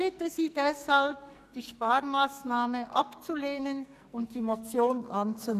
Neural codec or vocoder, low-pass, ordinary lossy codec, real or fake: codec, 44.1 kHz, 2.6 kbps, SNAC; 14.4 kHz; none; fake